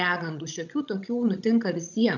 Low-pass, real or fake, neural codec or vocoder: 7.2 kHz; fake; codec, 16 kHz, 16 kbps, FreqCodec, larger model